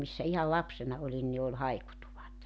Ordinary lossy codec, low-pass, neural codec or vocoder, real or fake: none; none; none; real